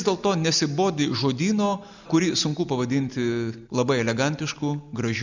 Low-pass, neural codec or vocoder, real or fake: 7.2 kHz; none; real